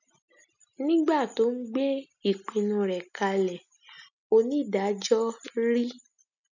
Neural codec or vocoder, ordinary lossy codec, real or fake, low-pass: none; none; real; 7.2 kHz